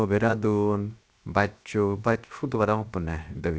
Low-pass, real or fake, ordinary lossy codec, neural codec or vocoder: none; fake; none; codec, 16 kHz, 0.7 kbps, FocalCodec